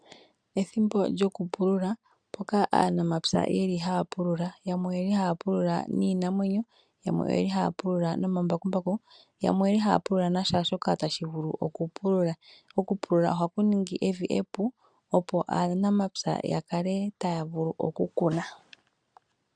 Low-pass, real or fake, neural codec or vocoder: 9.9 kHz; real; none